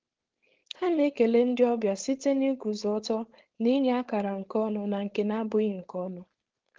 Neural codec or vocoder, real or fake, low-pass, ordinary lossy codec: codec, 16 kHz, 4.8 kbps, FACodec; fake; 7.2 kHz; Opus, 16 kbps